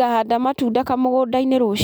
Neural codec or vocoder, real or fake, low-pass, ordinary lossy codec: none; real; none; none